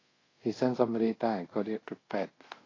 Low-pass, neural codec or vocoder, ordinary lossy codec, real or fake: 7.2 kHz; codec, 24 kHz, 0.5 kbps, DualCodec; AAC, 32 kbps; fake